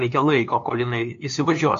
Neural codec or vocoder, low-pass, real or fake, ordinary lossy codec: codec, 16 kHz, 2 kbps, FunCodec, trained on LibriTTS, 25 frames a second; 7.2 kHz; fake; AAC, 48 kbps